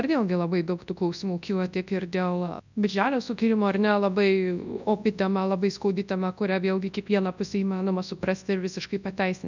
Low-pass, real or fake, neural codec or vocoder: 7.2 kHz; fake; codec, 24 kHz, 0.9 kbps, WavTokenizer, large speech release